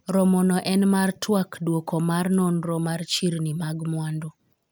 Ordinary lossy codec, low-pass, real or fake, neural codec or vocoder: none; none; real; none